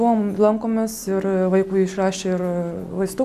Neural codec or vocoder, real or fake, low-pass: none; real; 14.4 kHz